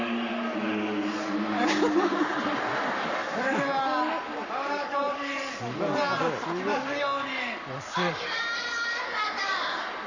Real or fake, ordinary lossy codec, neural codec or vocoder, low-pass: fake; Opus, 64 kbps; codec, 44.1 kHz, 7.8 kbps, Pupu-Codec; 7.2 kHz